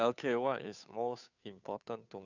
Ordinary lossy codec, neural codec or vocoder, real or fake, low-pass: none; codec, 16 kHz, 2 kbps, FunCodec, trained on Chinese and English, 25 frames a second; fake; 7.2 kHz